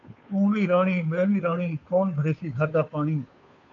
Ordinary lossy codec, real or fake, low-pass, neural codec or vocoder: MP3, 64 kbps; fake; 7.2 kHz; codec, 16 kHz, 2 kbps, FunCodec, trained on Chinese and English, 25 frames a second